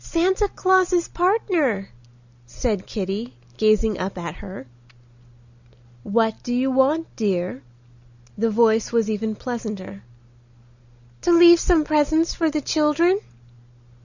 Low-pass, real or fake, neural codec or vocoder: 7.2 kHz; real; none